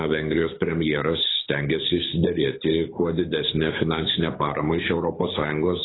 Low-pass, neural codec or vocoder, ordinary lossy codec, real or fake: 7.2 kHz; codec, 24 kHz, 6 kbps, HILCodec; AAC, 16 kbps; fake